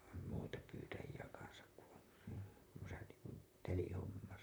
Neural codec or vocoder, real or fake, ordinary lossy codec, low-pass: vocoder, 44.1 kHz, 128 mel bands, Pupu-Vocoder; fake; none; none